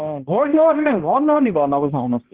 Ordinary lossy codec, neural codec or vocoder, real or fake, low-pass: Opus, 16 kbps; codec, 16 kHz, 1 kbps, X-Codec, HuBERT features, trained on balanced general audio; fake; 3.6 kHz